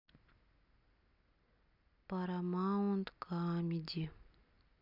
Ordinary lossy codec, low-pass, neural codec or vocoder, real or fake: none; 5.4 kHz; none; real